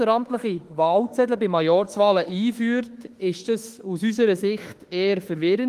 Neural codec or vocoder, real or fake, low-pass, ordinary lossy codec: autoencoder, 48 kHz, 32 numbers a frame, DAC-VAE, trained on Japanese speech; fake; 14.4 kHz; Opus, 24 kbps